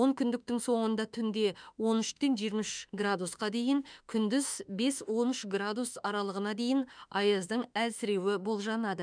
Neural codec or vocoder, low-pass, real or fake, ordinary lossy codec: autoencoder, 48 kHz, 32 numbers a frame, DAC-VAE, trained on Japanese speech; 9.9 kHz; fake; none